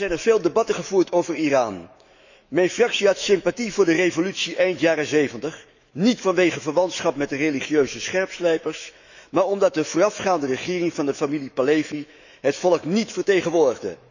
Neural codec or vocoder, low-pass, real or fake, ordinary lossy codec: autoencoder, 48 kHz, 128 numbers a frame, DAC-VAE, trained on Japanese speech; 7.2 kHz; fake; none